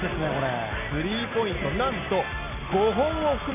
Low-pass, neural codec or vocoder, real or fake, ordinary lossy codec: 3.6 kHz; none; real; none